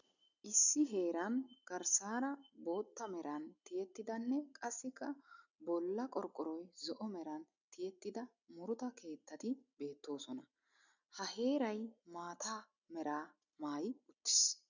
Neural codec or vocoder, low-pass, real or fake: none; 7.2 kHz; real